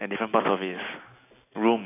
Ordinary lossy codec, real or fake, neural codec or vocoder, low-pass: AAC, 24 kbps; real; none; 3.6 kHz